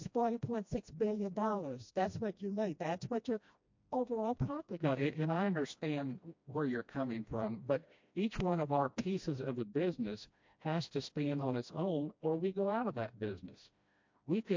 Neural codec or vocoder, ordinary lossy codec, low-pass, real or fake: codec, 16 kHz, 1 kbps, FreqCodec, smaller model; MP3, 48 kbps; 7.2 kHz; fake